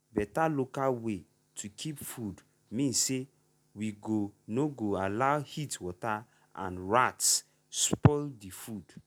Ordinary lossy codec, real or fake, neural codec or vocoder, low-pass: none; real; none; none